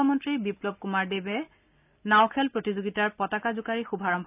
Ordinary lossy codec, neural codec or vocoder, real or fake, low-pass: none; none; real; 3.6 kHz